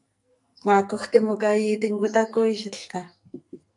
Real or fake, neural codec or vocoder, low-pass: fake; codec, 44.1 kHz, 2.6 kbps, SNAC; 10.8 kHz